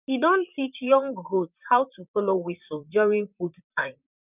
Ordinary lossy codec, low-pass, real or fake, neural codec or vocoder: none; 3.6 kHz; fake; vocoder, 44.1 kHz, 128 mel bands, Pupu-Vocoder